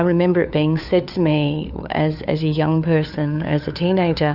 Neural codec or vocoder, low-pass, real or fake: codec, 16 kHz, 4 kbps, FunCodec, trained on LibriTTS, 50 frames a second; 5.4 kHz; fake